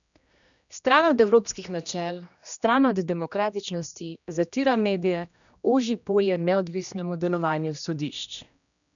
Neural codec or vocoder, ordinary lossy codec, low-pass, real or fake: codec, 16 kHz, 1 kbps, X-Codec, HuBERT features, trained on general audio; none; 7.2 kHz; fake